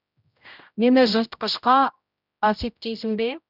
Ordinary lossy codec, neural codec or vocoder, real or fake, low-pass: none; codec, 16 kHz, 0.5 kbps, X-Codec, HuBERT features, trained on general audio; fake; 5.4 kHz